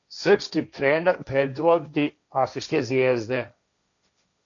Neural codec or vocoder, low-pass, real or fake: codec, 16 kHz, 1.1 kbps, Voila-Tokenizer; 7.2 kHz; fake